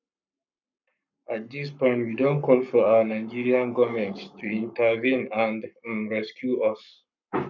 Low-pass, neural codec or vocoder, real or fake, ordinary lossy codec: 7.2 kHz; codec, 44.1 kHz, 7.8 kbps, Pupu-Codec; fake; none